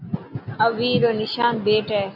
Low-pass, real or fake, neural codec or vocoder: 5.4 kHz; real; none